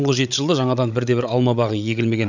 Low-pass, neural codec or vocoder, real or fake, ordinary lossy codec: 7.2 kHz; codec, 16 kHz, 8 kbps, FreqCodec, larger model; fake; none